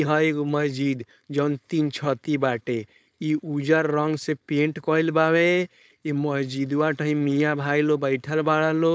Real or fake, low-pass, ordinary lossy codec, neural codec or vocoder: fake; none; none; codec, 16 kHz, 4.8 kbps, FACodec